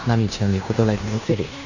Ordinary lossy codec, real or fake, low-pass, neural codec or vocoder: none; fake; 7.2 kHz; codec, 16 kHz in and 24 kHz out, 0.9 kbps, LongCat-Audio-Codec, fine tuned four codebook decoder